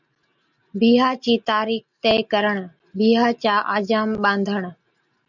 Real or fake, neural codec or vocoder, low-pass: real; none; 7.2 kHz